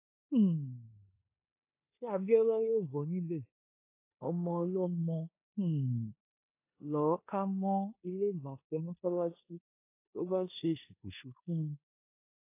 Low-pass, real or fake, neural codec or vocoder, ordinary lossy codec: 3.6 kHz; fake; codec, 16 kHz in and 24 kHz out, 0.9 kbps, LongCat-Audio-Codec, four codebook decoder; AAC, 24 kbps